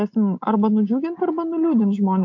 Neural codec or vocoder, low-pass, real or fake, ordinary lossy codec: none; 7.2 kHz; real; MP3, 48 kbps